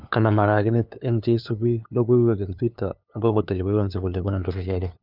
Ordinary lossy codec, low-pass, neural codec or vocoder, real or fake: none; 5.4 kHz; codec, 16 kHz, 2 kbps, FunCodec, trained on LibriTTS, 25 frames a second; fake